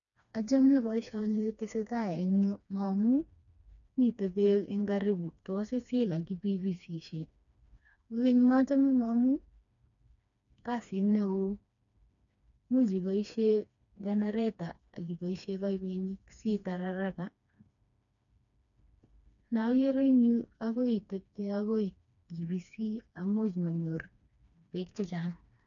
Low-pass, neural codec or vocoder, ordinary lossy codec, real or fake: 7.2 kHz; codec, 16 kHz, 2 kbps, FreqCodec, smaller model; none; fake